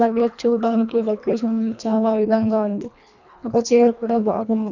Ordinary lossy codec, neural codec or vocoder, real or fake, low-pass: none; codec, 24 kHz, 1.5 kbps, HILCodec; fake; 7.2 kHz